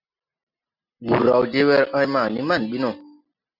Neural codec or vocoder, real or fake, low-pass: none; real; 5.4 kHz